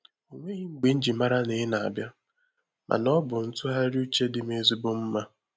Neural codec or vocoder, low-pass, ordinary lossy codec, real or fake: none; none; none; real